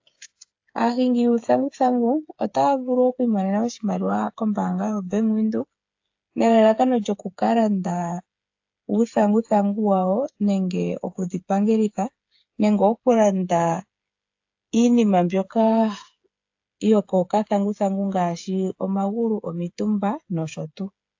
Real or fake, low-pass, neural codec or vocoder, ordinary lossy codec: fake; 7.2 kHz; codec, 16 kHz, 8 kbps, FreqCodec, smaller model; AAC, 48 kbps